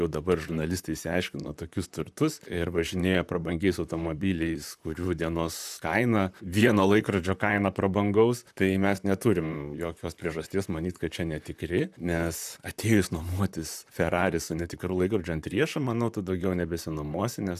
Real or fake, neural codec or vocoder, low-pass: fake; vocoder, 44.1 kHz, 128 mel bands, Pupu-Vocoder; 14.4 kHz